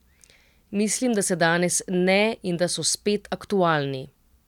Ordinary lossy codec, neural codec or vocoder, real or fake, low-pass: none; none; real; 19.8 kHz